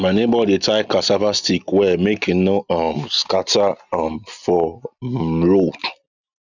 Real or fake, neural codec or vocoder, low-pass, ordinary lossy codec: real; none; 7.2 kHz; none